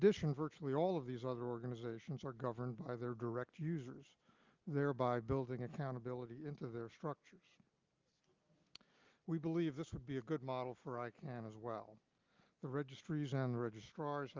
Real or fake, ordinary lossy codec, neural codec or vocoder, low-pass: real; Opus, 24 kbps; none; 7.2 kHz